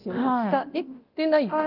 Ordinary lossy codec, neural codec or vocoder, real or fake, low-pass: Opus, 32 kbps; codec, 24 kHz, 0.9 kbps, DualCodec; fake; 5.4 kHz